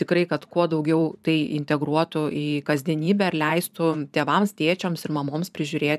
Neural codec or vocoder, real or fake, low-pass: vocoder, 44.1 kHz, 128 mel bands every 512 samples, BigVGAN v2; fake; 14.4 kHz